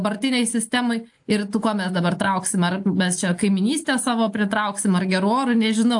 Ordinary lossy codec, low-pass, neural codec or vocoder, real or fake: AAC, 64 kbps; 10.8 kHz; none; real